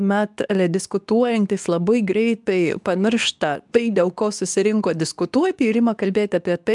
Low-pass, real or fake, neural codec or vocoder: 10.8 kHz; fake; codec, 24 kHz, 0.9 kbps, WavTokenizer, medium speech release version 2